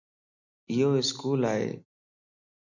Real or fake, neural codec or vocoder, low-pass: real; none; 7.2 kHz